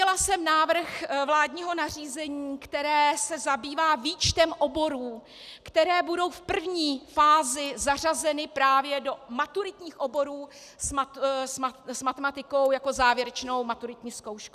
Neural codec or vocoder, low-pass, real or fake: none; 14.4 kHz; real